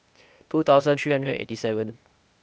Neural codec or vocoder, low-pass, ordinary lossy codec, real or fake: codec, 16 kHz, 0.8 kbps, ZipCodec; none; none; fake